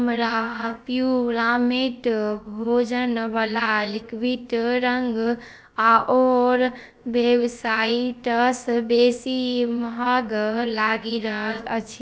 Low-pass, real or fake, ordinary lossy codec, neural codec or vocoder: none; fake; none; codec, 16 kHz, about 1 kbps, DyCAST, with the encoder's durations